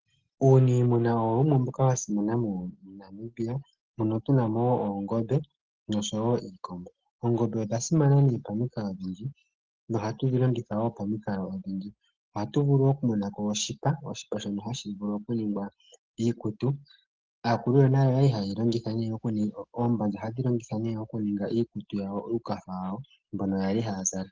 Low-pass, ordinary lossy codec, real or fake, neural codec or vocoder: 7.2 kHz; Opus, 16 kbps; real; none